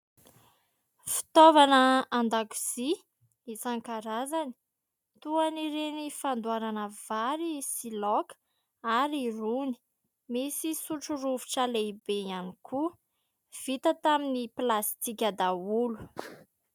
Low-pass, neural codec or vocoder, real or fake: 19.8 kHz; none; real